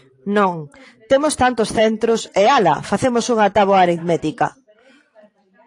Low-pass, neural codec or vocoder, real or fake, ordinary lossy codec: 10.8 kHz; vocoder, 44.1 kHz, 128 mel bands every 512 samples, BigVGAN v2; fake; AAC, 48 kbps